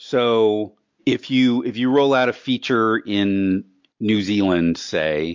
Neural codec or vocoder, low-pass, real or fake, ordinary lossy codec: none; 7.2 kHz; real; MP3, 48 kbps